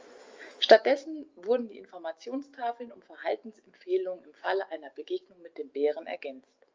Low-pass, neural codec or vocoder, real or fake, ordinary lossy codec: 7.2 kHz; autoencoder, 48 kHz, 128 numbers a frame, DAC-VAE, trained on Japanese speech; fake; Opus, 32 kbps